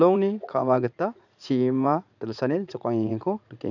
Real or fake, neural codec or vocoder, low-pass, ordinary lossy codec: fake; vocoder, 44.1 kHz, 80 mel bands, Vocos; 7.2 kHz; none